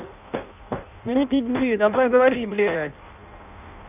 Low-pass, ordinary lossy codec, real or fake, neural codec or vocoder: 3.6 kHz; none; fake; codec, 16 kHz in and 24 kHz out, 0.6 kbps, FireRedTTS-2 codec